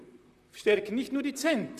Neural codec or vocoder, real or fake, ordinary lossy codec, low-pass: vocoder, 44.1 kHz, 128 mel bands, Pupu-Vocoder; fake; none; 14.4 kHz